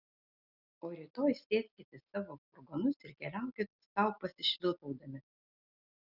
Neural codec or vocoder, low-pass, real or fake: none; 5.4 kHz; real